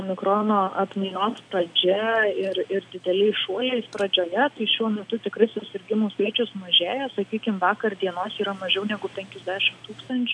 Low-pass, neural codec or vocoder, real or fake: 9.9 kHz; none; real